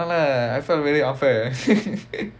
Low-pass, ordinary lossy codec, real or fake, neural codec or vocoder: none; none; real; none